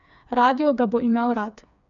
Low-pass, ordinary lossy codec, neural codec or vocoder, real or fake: 7.2 kHz; none; codec, 16 kHz, 4 kbps, FreqCodec, smaller model; fake